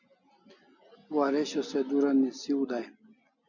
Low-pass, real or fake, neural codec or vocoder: 7.2 kHz; real; none